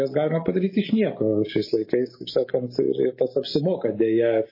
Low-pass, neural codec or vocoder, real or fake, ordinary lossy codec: 5.4 kHz; none; real; MP3, 24 kbps